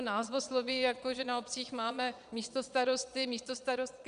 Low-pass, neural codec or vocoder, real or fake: 9.9 kHz; vocoder, 22.05 kHz, 80 mel bands, Vocos; fake